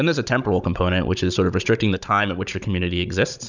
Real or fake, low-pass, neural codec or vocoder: fake; 7.2 kHz; codec, 16 kHz, 16 kbps, FunCodec, trained on Chinese and English, 50 frames a second